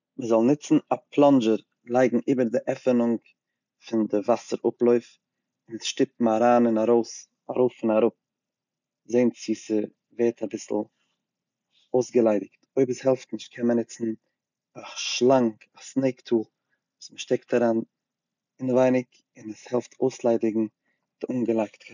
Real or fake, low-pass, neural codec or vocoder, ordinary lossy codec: real; 7.2 kHz; none; none